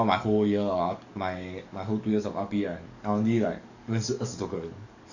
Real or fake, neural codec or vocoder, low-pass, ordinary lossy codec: fake; codec, 44.1 kHz, 7.8 kbps, DAC; 7.2 kHz; none